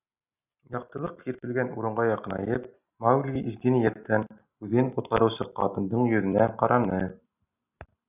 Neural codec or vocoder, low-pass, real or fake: none; 3.6 kHz; real